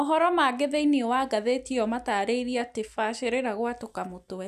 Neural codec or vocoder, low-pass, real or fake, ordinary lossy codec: none; 14.4 kHz; real; none